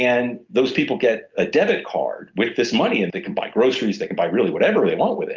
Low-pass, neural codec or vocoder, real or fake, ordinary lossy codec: 7.2 kHz; none; real; Opus, 24 kbps